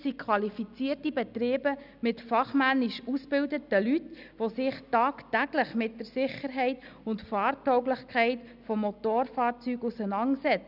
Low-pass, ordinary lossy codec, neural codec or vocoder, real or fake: 5.4 kHz; none; none; real